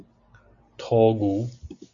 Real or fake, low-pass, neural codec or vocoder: real; 7.2 kHz; none